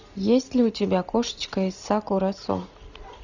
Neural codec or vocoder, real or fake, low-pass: none; real; 7.2 kHz